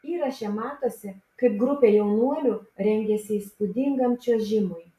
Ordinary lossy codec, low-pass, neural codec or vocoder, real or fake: AAC, 64 kbps; 14.4 kHz; none; real